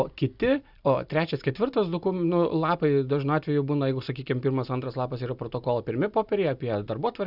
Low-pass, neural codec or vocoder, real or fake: 5.4 kHz; none; real